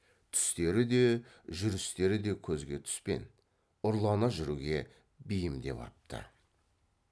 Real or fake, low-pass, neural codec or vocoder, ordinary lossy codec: real; none; none; none